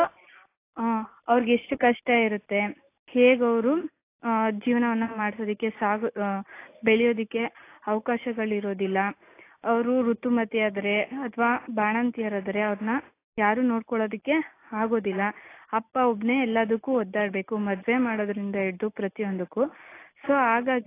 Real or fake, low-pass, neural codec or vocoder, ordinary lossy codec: real; 3.6 kHz; none; AAC, 24 kbps